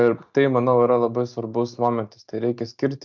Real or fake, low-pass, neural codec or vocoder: real; 7.2 kHz; none